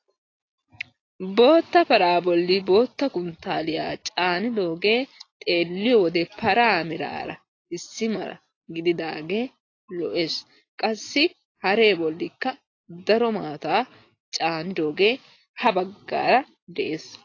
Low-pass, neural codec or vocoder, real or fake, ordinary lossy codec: 7.2 kHz; none; real; AAC, 32 kbps